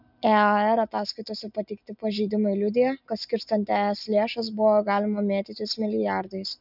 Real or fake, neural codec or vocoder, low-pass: real; none; 5.4 kHz